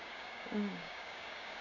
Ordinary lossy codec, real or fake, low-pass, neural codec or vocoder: none; fake; 7.2 kHz; codec, 16 kHz, 6 kbps, DAC